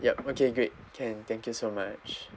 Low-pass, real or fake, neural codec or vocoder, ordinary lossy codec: none; real; none; none